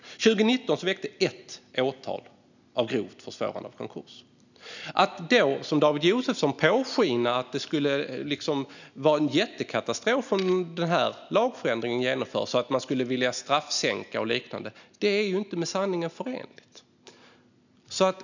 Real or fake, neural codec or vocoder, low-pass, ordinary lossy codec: real; none; 7.2 kHz; none